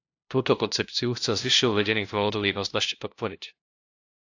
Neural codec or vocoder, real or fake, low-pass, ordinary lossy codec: codec, 16 kHz, 0.5 kbps, FunCodec, trained on LibriTTS, 25 frames a second; fake; 7.2 kHz; AAC, 48 kbps